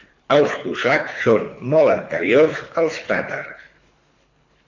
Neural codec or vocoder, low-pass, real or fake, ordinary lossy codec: codec, 24 kHz, 3 kbps, HILCodec; 7.2 kHz; fake; AAC, 48 kbps